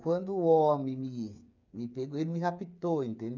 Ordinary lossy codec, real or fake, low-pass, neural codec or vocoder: none; fake; 7.2 kHz; codec, 16 kHz, 8 kbps, FreqCodec, smaller model